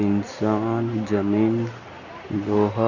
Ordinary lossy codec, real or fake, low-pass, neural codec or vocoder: none; real; 7.2 kHz; none